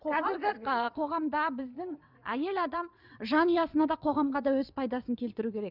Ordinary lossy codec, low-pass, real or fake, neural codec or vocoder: none; 5.4 kHz; fake; vocoder, 22.05 kHz, 80 mel bands, WaveNeXt